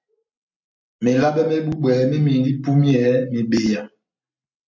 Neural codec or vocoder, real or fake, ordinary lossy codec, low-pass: none; real; MP3, 64 kbps; 7.2 kHz